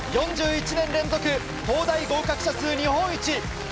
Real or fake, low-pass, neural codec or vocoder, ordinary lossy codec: real; none; none; none